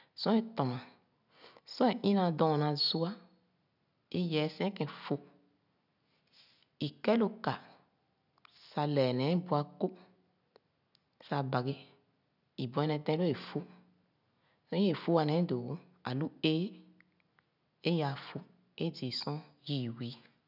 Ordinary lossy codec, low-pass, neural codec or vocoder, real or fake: none; 5.4 kHz; none; real